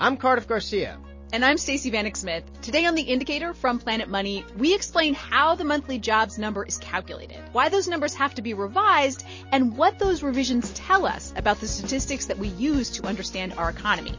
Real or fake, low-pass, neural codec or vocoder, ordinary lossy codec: real; 7.2 kHz; none; MP3, 32 kbps